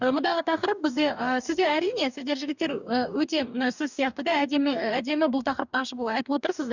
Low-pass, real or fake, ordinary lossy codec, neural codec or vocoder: 7.2 kHz; fake; none; codec, 44.1 kHz, 2.6 kbps, DAC